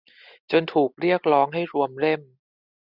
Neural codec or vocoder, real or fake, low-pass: none; real; 5.4 kHz